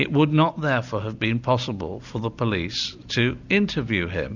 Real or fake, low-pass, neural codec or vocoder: real; 7.2 kHz; none